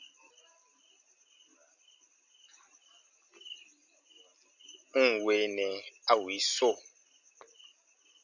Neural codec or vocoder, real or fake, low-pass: none; real; 7.2 kHz